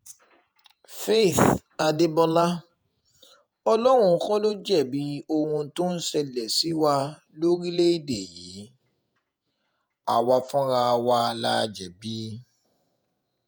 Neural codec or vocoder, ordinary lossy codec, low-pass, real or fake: vocoder, 48 kHz, 128 mel bands, Vocos; none; none; fake